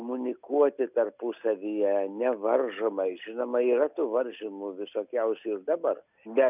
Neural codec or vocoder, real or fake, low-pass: none; real; 3.6 kHz